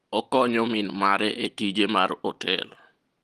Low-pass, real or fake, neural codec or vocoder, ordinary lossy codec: 14.4 kHz; real; none; Opus, 24 kbps